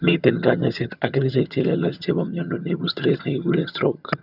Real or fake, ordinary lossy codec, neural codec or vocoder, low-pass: fake; none; vocoder, 22.05 kHz, 80 mel bands, HiFi-GAN; 5.4 kHz